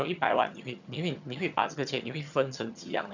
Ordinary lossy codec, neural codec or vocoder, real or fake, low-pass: none; vocoder, 22.05 kHz, 80 mel bands, HiFi-GAN; fake; 7.2 kHz